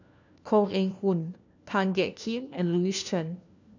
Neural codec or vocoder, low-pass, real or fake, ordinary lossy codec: codec, 16 kHz, 1 kbps, FunCodec, trained on LibriTTS, 50 frames a second; 7.2 kHz; fake; none